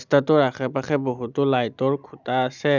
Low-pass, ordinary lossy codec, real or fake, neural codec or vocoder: 7.2 kHz; none; real; none